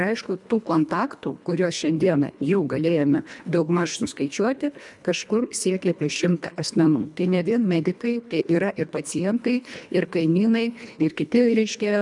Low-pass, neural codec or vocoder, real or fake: 10.8 kHz; codec, 24 kHz, 1.5 kbps, HILCodec; fake